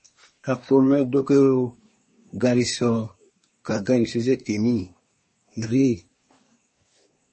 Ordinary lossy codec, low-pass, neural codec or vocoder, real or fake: MP3, 32 kbps; 10.8 kHz; codec, 24 kHz, 1 kbps, SNAC; fake